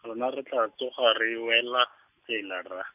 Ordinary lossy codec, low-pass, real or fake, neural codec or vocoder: none; 3.6 kHz; real; none